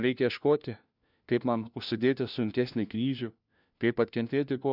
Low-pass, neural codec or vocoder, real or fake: 5.4 kHz; codec, 16 kHz, 1 kbps, FunCodec, trained on LibriTTS, 50 frames a second; fake